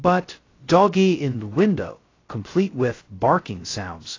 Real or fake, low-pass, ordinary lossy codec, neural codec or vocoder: fake; 7.2 kHz; AAC, 32 kbps; codec, 16 kHz, 0.2 kbps, FocalCodec